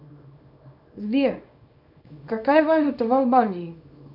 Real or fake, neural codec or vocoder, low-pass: fake; codec, 24 kHz, 0.9 kbps, WavTokenizer, small release; 5.4 kHz